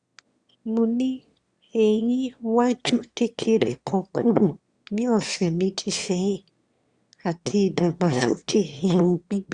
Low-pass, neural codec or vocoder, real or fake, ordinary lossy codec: 9.9 kHz; autoencoder, 22.05 kHz, a latent of 192 numbers a frame, VITS, trained on one speaker; fake; Opus, 64 kbps